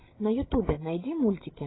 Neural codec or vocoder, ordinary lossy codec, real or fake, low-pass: codec, 16 kHz, 8 kbps, FreqCodec, larger model; AAC, 16 kbps; fake; 7.2 kHz